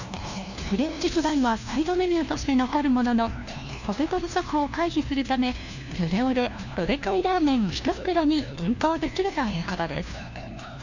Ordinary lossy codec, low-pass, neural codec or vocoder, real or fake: none; 7.2 kHz; codec, 16 kHz, 1 kbps, FunCodec, trained on LibriTTS, 50 frames a second; fake